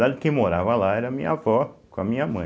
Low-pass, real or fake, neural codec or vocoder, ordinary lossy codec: none; real; none; none